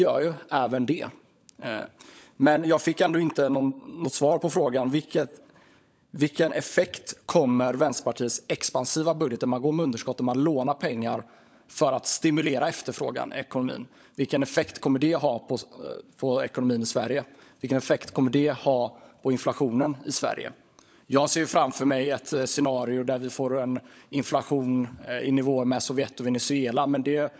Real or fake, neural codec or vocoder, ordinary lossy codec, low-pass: fake; codec, 16 kHz, 16 kbps, FunCodec, trained on LibriTTS, 50 frames a second; none; none